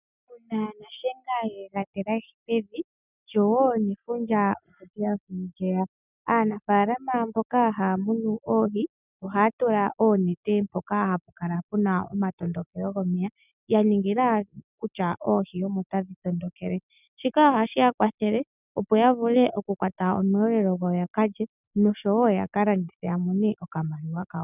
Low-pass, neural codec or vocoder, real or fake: 3.6 kHz; none; real